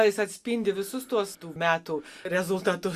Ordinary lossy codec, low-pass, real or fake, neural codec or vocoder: Opus, 64 kbps; 14.4 kHz; real; none